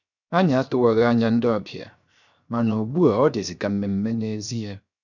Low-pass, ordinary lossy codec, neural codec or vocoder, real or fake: 7.2 kHz; none; codec, 16 kHz, 0.7 kbps, FocalCodec; fake